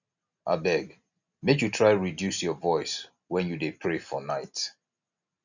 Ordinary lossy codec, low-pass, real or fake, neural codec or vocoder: none; 7.2 kHz; real; none